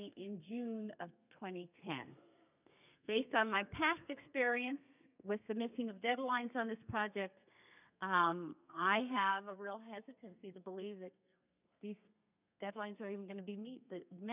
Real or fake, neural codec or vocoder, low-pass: fake; codec, 32 kHz, 1.9 kbps, SNAC; 3.6 kHz